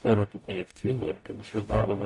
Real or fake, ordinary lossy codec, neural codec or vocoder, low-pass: fake; MP3, 48 kbps; codec, 44.1 kHz, 0.9 kbps, DAC; 10.8 kHz